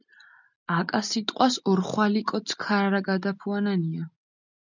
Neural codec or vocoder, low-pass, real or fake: none; 7.2 kHz; real